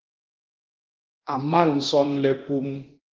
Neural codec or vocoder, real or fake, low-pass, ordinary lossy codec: codec, 24 kHz, 0.9 kbps, DualCodec; fake; 7.2 kHz; Opus, 16 kbps